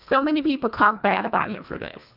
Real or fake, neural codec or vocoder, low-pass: fake; codec, 24 kHz, 1.5 kbps, HILCodec; 5.4 kHz